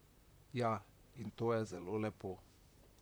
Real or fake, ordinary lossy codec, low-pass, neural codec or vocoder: fake; none; none; vocoder, 44.1 kHz, 128 mel bands, Pupu-Vocoder